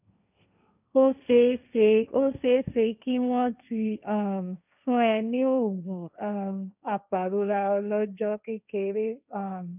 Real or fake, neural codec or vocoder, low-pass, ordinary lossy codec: fake; codec, 16 kHz, 1.1 kbps, Voila-Tokenizer; 3.6 kHz; none